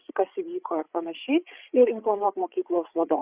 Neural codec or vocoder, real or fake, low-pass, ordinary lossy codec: codec, 16 kHz, 8 kbps, FreqCodec, larger model; fake; 3.6 kHz; Opus, 64 kbps